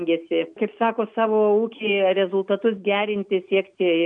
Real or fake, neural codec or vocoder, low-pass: real; none; 9.9 kHz